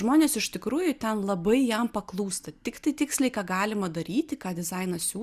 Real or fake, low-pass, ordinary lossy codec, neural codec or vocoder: real; 14.4 kHz; Opus, 64 kbps; none